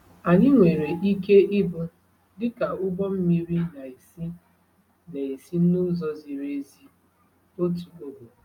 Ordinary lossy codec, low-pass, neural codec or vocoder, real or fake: none; 19.8 kHz; none; real